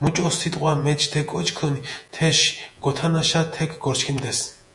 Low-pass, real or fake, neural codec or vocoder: 10.8 kHz; fake; vocoder, 48 kHz, 128 mel bands, Vocos